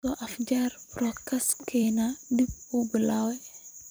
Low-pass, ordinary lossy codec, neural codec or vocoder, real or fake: none; none; vocoder, 44.1 kHz, 128 mel bands every 256 samples, BigVGAN v2; fake